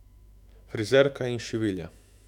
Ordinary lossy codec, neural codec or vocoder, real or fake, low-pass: none; autoencoder, 48 kHz, 128 numbers a frame, DAC-VAE, trained on Japanese speech; fake; 19.8 kHz